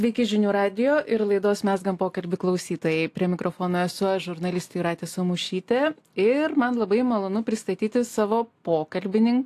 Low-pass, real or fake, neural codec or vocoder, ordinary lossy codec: 14.4 kHz; real; none; AAC, 64 kbps